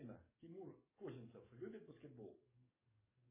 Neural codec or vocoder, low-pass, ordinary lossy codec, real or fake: codec, 44.1 kHz, 7.8 kbps, DAC; 3.6 kHz; MP3, 16 kbps; fake